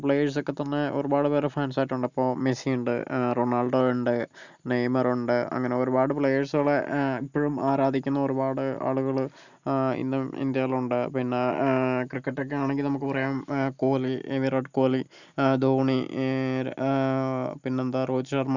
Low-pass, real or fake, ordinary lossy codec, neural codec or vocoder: 7.2 kHz; real; none; none